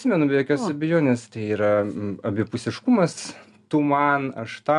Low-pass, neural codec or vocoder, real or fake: 10.8 kHz; none; real